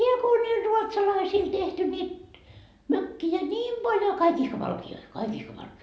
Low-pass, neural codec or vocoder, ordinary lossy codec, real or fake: none; none; none; real